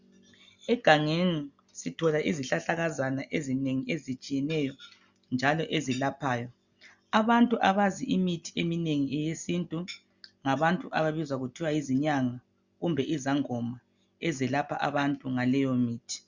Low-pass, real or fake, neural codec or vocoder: 7.2 kHz; real; none